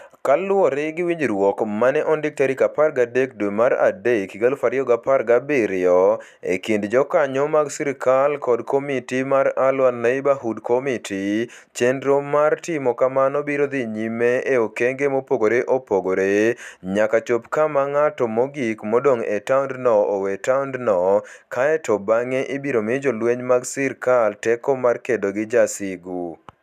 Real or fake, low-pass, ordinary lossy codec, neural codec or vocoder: real; 14.4 kHz; none; none